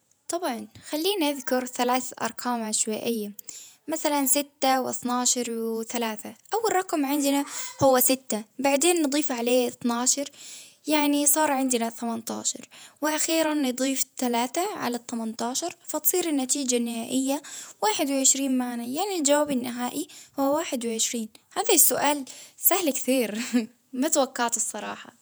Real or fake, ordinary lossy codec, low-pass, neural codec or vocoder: fake; none; none; vocoder, 48 kHz, 128 mel bands, Vocos